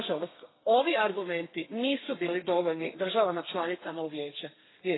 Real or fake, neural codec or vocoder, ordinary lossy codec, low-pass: fake; codec, 32 kHz, 1.9 kbps, SNAC; AAC, 16 kbps; 7.2 kHz